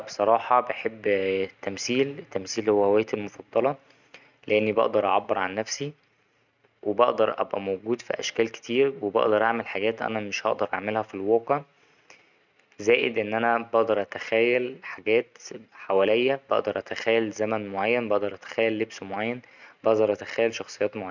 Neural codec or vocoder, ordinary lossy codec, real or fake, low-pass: none; none; real; 7.2 kHz